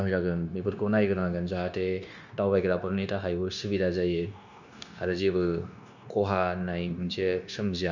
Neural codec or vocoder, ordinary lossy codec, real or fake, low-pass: codec, 16 kHz, 0.9 kbps, LongCat-Audio-Codec; none; fake; 7.2 kHz